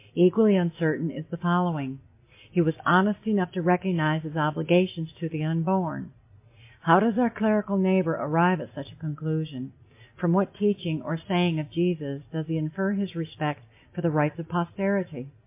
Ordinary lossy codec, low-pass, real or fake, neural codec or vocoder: MP3, 24 kbps; 3.6 kHz; real; none